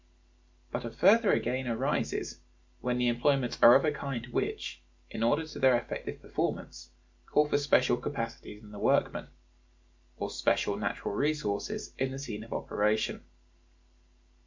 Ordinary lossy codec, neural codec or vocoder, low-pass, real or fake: MP3, 64 kbps; none; 7.2 kHz; real